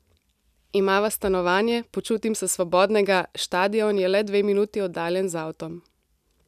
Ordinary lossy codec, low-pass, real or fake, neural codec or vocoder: none; 14.4 kHz; real; none